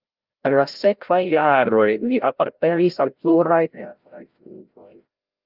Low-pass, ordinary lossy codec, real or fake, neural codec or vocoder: 5.4 kHz; Opus, 24 kbps; fake; codec, 16 kHz, 0.5 kbps, FreqCodec, larger model